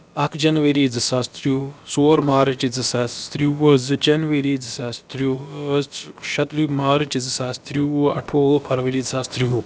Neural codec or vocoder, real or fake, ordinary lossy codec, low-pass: codec, 16 kHz, about 1 kbps, DyCAST, with the encoder's durations; fake; none; none